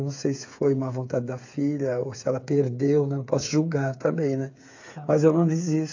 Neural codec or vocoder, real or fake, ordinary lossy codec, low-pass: codec, 16 kHz, 8 kbps, FreqCodec, smaller model; fake; AAC, 48 kbps; 7.2 kHz